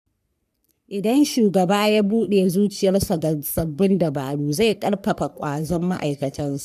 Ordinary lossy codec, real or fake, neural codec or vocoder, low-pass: none; fake; codec, 44.1 kHz, 3.4 kbps, Pupu-Codec; 14.4 kHz